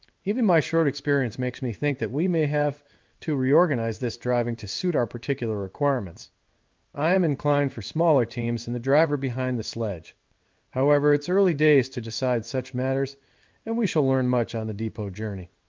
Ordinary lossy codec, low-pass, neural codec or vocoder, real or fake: Opus, 32 kbps; 7.2 kHz; codec, 16 kHz in and 24 kHz out, 1 kbps, XY-Tokenizer; fake